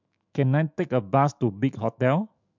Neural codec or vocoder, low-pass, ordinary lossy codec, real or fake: autoencoder, 48 kHz, 128 numbers a frame, DAC-VAE, trained on Japanese speech; 7.2 kHz; MP3, 64 kbps; fake